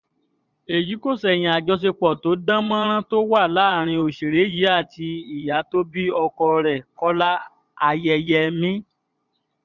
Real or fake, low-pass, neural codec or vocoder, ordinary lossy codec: fake; 7.2 kHz; vocoder, 22.05 kHz, 80 mel bands, Vocos; none